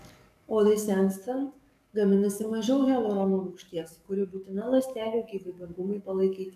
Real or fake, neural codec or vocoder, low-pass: fake; codec, 44.1 kHz, 7.8 kbps, DAC; 14.4 kHz